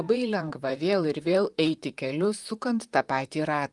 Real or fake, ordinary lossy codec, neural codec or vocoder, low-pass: fake; Opus, 32 kbps; vocoder, 44.1 kHz, 128 mel bands, Pupu-Vocoder; 10.8 kHz